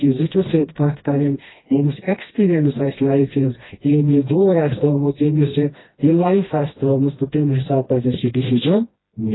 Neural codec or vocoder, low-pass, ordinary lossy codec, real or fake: codec, 16 kHz, 1 kbps, FreqCodec, smaller model; 7.2 kHz; AAC, 16 kbps; fake